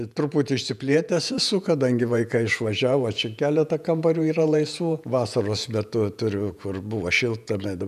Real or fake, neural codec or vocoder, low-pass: fake; vocoder, 48 kHz, 128 mel bands, Vocos; 14.4 kHz